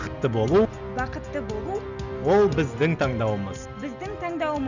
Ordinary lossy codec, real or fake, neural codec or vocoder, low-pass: none; real; none; 7.2 kHz